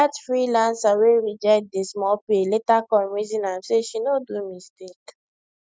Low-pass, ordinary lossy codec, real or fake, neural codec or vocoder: none; none; real; none